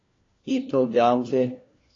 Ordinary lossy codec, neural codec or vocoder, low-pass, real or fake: AAC, 32 kbps; codec, 16 kHz, 1 kbps, FunCodec, trained on LibriTTS, 50 frames a second; 7.2 kHz; fake